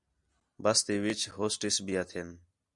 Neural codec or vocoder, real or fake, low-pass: none; real; 10.8 kHz